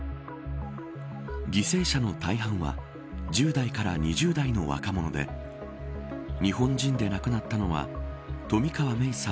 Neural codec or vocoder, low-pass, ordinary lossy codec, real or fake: none; none; none; real